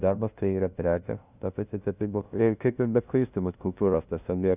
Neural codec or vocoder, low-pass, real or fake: codec, 16 kHz, 0.5 kbps, FunCodec, trained on LibriTTS, 25 frames a second; 3.6 kHz; fake